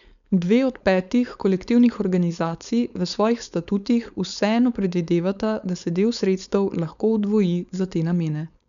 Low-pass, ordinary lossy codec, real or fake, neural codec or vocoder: 7.2 kHz; none; fake; codec, 16 kHz, 4.8 kbps, FACodec